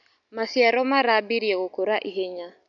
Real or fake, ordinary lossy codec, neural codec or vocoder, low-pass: real; none; none; 7.2 kHz